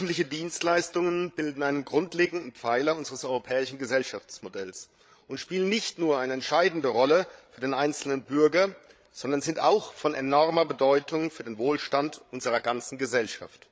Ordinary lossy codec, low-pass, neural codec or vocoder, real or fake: none; none; codec, 16 kHz, 8 kbps, FreqCodec, larger model; fake